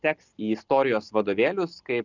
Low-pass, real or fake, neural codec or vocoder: 7.2 kHz; real; none